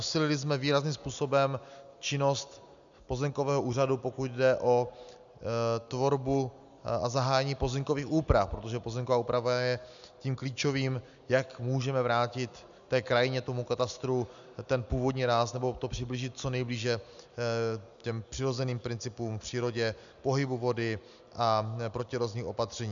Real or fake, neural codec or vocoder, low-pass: real; none; 7.2 kHz